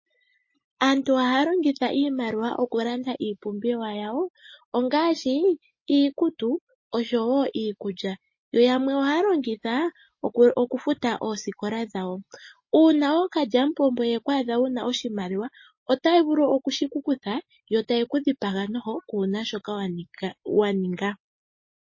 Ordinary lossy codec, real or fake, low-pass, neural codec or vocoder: MP3, 32 kbps; real; 7.2 kHz; none